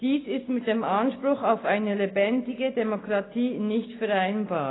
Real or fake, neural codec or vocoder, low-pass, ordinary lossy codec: real; none; 7.2 kHz; AAC, 16 kbps